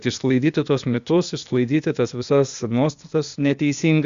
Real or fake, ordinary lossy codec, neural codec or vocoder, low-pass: fake; Opus, 64 kbps; codec, 16 kHz, 0.8 kbps, ZipCodec; 7.2 kHz